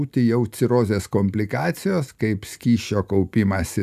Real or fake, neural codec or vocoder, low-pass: real; none; 14.4 kHz